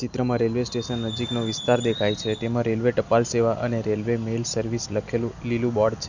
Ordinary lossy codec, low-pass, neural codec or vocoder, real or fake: none; 7.2 kHz; none; real